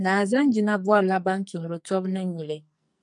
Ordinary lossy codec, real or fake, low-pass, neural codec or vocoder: MP3, 96 kbps; fake; 10.8 kHz; codec, 44.1 kHz, 2.6 kbps, SNAC